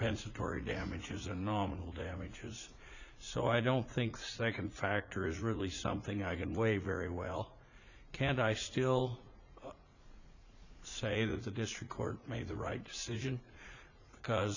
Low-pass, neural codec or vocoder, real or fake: 7.2 kHz; vocoder, 44.1 kHz, 80 mel bands, Vocos; fake